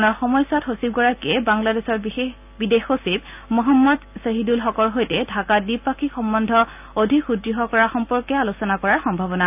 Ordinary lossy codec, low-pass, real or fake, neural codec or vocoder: none; 3.6 kHz; real; none